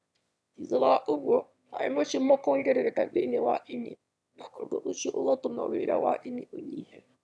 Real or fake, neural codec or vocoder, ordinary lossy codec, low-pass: fake; autoencoder, 22.05 kHz, a latent of 192 numbers a frame, VITS, trained on one speaker; none; none